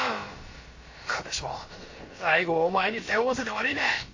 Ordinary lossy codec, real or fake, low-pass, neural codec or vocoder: MP3, 32 kbps; fake; 7.2 kHz; codec, 16 kHz, about 1 kbps, DyCAST, with the encoder's durations